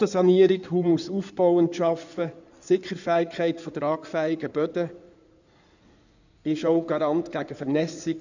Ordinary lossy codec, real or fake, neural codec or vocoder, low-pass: none; fake; codec, 16 kHz in and 24 kHz out, 2.2 kbps, FireRedTTS-2 codec; 7.2 kHz